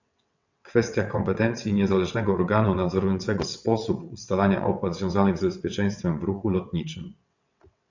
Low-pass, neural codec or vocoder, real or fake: 7.2 kHz; vocoder, 22.05 kHz, 80 mel bands, WaveNeXt; fake